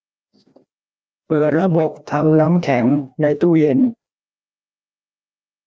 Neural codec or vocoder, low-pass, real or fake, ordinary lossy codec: codec, 16 kHz, 1 kbps, FreqCodec, larger model; none; fake; none